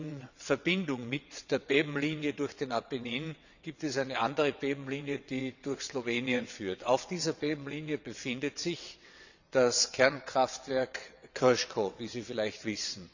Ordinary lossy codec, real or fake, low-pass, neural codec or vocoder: none; fake; 7.2 kHz; vocoder, 22.05 kHz, 80 mel bands, WaveNeXt